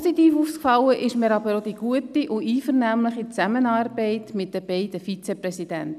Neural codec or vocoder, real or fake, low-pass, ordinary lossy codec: none; real; 14.4 kHz; none